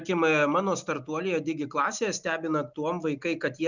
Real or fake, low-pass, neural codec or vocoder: real; 7.2 kHz; none